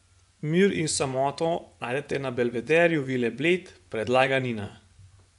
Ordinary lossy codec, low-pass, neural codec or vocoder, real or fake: none; 10.8 kHz; vocoder, 24 kHz, 100 mel bands, Vocos; fake